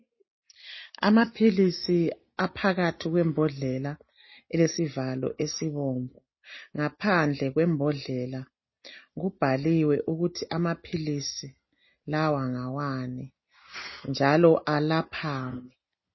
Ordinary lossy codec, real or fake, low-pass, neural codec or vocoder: MP3, 24 kbps; real; 7.2 kHz; none